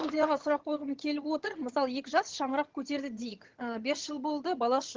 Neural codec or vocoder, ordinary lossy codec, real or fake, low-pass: vocoder, 22.05 kHz, 80 mel bands, HiFi-GAN; Opus, 16 kbps; fake; 7.2 kHz